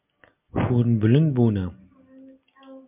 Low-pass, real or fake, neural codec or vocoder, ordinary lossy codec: 3.6 kHz; real; none; MP3, 32 kbps